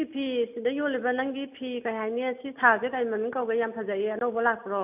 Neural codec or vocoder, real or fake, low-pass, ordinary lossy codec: none; real; 3.6 kHz; AAC, 32 kbps